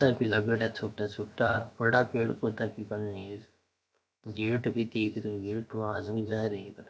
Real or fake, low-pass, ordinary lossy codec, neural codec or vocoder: fake; none; none; codec, 16 kHz, about 1 kbps, DyCAST, with the encoder's durations